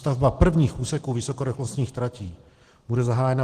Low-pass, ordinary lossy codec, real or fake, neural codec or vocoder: 14.4 kHz; Opus, 16 kbps; real; none